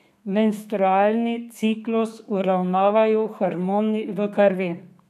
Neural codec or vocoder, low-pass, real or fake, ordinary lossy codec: codec, 32 kHz, 1.9 kbps, SNAC; 14.4 kHz; fake; none